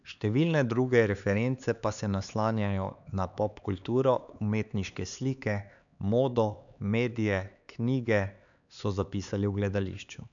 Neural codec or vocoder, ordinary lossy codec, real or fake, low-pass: codec, 16 kHz, 4 kbps, X-Codec, HuBERT features, trained on LibriSpeech; none; fake; 7.2 kHz